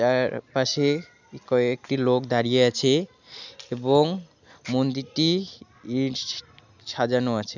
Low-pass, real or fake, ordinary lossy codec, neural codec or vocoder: 7.2 kHz; real; none; none